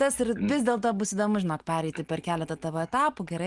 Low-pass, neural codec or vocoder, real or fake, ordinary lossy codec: 10.8 kHz; none; real; Opus, 24 kbps